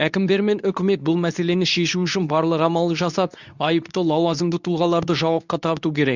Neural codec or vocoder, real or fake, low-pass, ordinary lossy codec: codec, 24 kHz, 0.9 kbps, WavTokenizer, medium speech release version 2; fake; 7.2 kHz; none